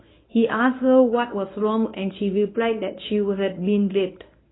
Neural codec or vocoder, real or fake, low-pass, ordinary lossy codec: codec, 24 kHz, 0.9 kbps, WavTokenizer, medium speech release version 1; fake; 7.2 kHz; AAC, 16 kbps